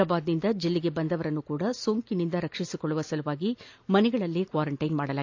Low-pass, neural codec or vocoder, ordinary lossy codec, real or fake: 7.2 kHz; none; AAC, 48 kbps; real